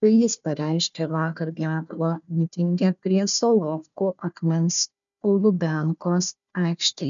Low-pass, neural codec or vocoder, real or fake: 7.2 kHz; codec, 16 kHz, 1 kbps, FunCodec, trained on Chinese and English, 50 frames a second; fake